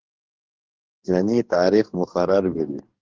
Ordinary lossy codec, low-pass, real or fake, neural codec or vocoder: Opus, 16 kbps; 7.2 kHz; fake; codec, 44.1 kHz, 2.6 kbps, DAC